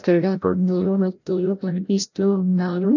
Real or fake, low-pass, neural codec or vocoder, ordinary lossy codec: fake; 7.2 kHz; codec, 16 kHz, 0.5 kbps, FreqCodec, larger model; none